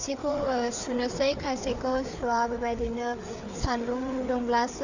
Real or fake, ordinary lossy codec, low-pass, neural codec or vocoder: fake; none; 7.2 kHz; codec, 16 kHz, 4 kbps, FreqCodec, larger model